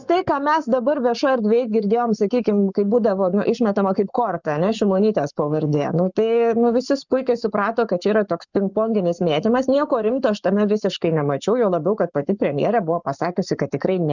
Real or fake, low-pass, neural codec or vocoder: fake; 7.2 kHz; codec, 44.1 kHz, 7.8 kbps, Pupu-Codec